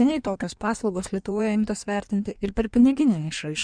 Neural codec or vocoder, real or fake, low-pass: codec, 16 kHz in and 24 kHz out, 1.1 kbps, FireRedTTS-2 codec; fake; 9.9 kHz